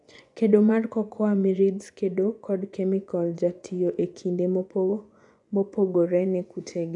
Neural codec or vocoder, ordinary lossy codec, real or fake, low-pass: vocoder, 24 kHz, 100 mel bands, Vocos; none; fake; 10.8 kHz